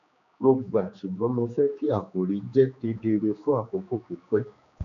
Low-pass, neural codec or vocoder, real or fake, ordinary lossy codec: 7.2 kHz; codec, 16 kHz, 2 kbps, X-Codec, HuBERT features, trained on general audio; fake; none